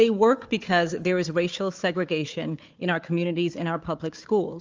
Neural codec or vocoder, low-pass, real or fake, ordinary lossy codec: codec, 16 kHz, 4 kbps, FunCodec, trained on Chinese and English, 50 frames a second; 7.2 kHz; fake; Opus, 32 kbps